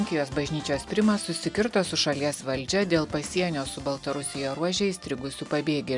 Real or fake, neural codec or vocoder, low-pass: real; none; 10.8 kHz